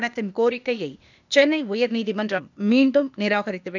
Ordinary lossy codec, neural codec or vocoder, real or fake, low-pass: none; codec, 16 kHz, 0.8 kbps, ZipCodec; fake; 7.2 kHz